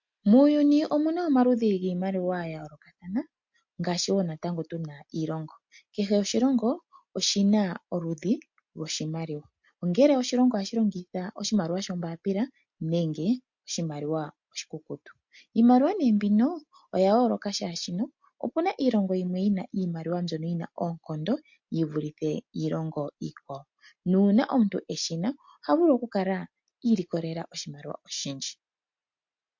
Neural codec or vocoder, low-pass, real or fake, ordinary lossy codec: none; 7.2 kHz; real; MP3, 48 kbps